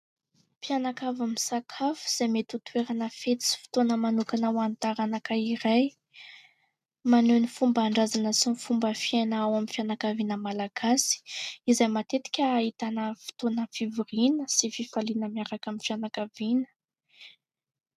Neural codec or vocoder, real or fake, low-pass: none; real; 14.4 kHz